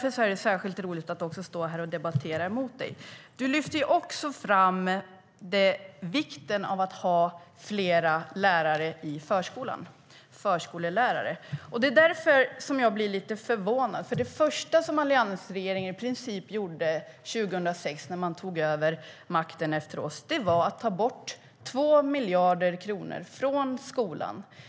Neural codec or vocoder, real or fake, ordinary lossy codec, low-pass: none; real; none; none